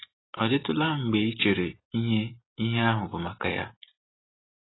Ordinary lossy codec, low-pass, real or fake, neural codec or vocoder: AAC, 16 kbps; 7.2 kHz; real; none